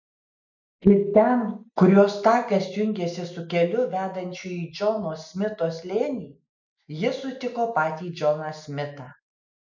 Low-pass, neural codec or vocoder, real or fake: 7.2 kHz; none; real